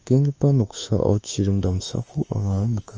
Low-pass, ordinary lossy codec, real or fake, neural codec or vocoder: 7.2 kHz; Opus, 24 kbps; fake; autoencoder, 48 kHz, 32 numbers a frame, DAC-VAE, trained on Japanese speech